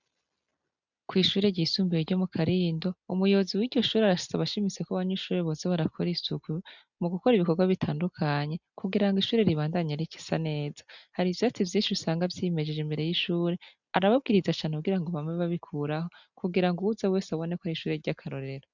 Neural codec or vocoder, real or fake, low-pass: none; real; 7.2 kHz